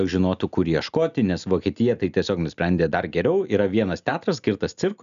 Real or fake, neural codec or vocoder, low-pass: real; none; 7.2 kHz